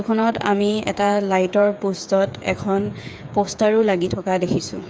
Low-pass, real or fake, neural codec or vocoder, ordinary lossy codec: none; fake; codec, 16 kHz, 8 kbps, FreqCodec, smaller model; none